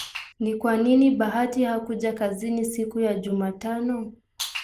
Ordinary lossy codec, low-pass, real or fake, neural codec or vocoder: Opus, 24 kbps; 14.4 kHz; real; none